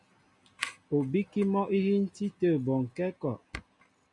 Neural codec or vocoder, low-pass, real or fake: none; 10.8 kHz; real